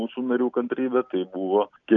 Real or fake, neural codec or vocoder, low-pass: real; none; 7.2 kHz